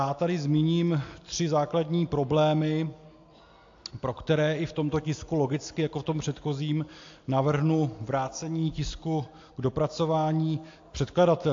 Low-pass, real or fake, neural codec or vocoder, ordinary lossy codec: 7.2 kHz; real; none; AAC, 48 kbps